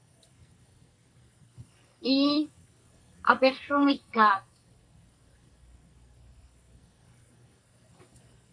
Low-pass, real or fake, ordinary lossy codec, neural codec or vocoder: 9.9 kHz; fake; MP3, 96 kbps; codec, 44.1 kHz, 2.6 kbps, SNAC